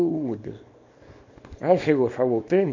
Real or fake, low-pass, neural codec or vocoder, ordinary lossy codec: fake; 7.2 kHz; codec, 24 kHz, 0.9 kbps, WavTokenizer, small release; MP3, 48 kbps